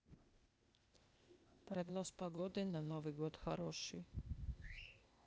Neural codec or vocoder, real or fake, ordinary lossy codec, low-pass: codec, 16 kHz, 0.8 kbps, ZipCodec; fake; none; none